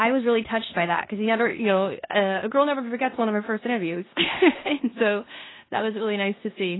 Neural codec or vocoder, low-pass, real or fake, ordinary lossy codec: codec, 16 kHz in and 24 kHz out, 0.9 kbps, LongCat-Audio-Codec, four codebook decoder; 7.2 kHz; fake; AAC, 16 kbps